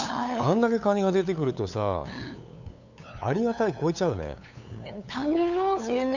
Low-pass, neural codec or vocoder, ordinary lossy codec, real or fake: 7.2 kHz; codec, 16 kHz, 8 kbps, FunCodec, trained on LibriTTS, 25 frames a second; none; fake